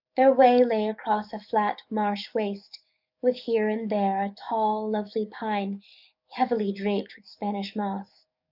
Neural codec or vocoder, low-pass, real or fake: codec, 44.1 kHz, 7.8 kbps, DAC; 5.4 kHz; fake